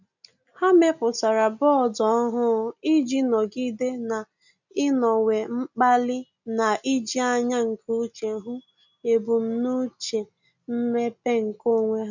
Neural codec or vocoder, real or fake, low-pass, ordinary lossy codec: none; real; 7.2 kHz; none